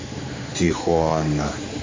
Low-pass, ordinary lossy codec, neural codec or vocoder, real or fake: 7.2 kHz; AAC, 32 kbps; codec, 16 kHz, 4 kbps, X-Codec, HuBERT features, trained on general audio; fake